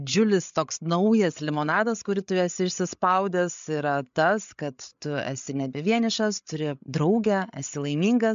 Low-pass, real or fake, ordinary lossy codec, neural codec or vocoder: 7.2 kHz; fake; MP3, 64 kbps; codec, 16 kHz, 8 kbps, FreqCodec, larger model